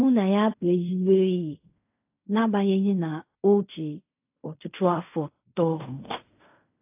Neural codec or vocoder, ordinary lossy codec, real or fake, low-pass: codec, 16 kHz in and 24 kHz out, 0.4 kbps, LongCat-Audio-Codec, fine tuned four codebook decoder; AAC, 32 kbps; fake; 3.6 kHz